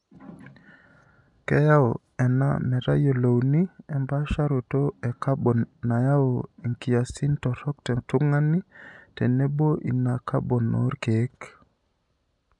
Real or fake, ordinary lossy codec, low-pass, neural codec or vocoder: real; none; 10.8 kHz; none